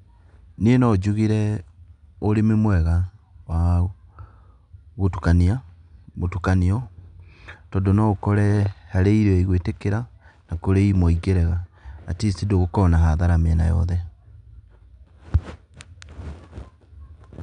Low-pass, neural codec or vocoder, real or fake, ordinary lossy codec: 9.9 kHz; none; real; none